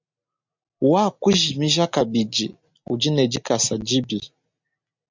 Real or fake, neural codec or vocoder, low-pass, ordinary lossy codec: real; none; 7.2 kHz; MP3, 64 kbps